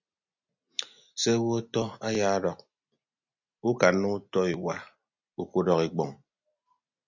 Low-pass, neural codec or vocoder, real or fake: 7.2 kHz; none; real